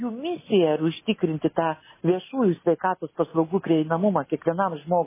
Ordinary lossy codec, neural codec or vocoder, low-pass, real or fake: MP3, 16 kbps; none; 3.6 kHz; real